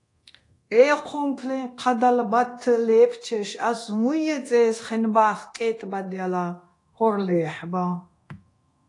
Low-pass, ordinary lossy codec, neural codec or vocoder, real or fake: 10.8 kHz; AAC, 48 kbps; codec, 24 kHz, 0.9 kbps, DualCodec; fake